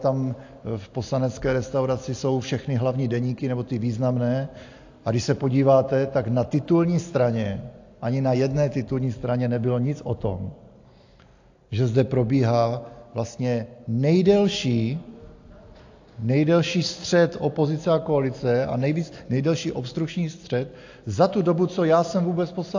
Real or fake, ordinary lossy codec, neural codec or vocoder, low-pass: real; AAC, 48 kbps; none; 7.2 kHz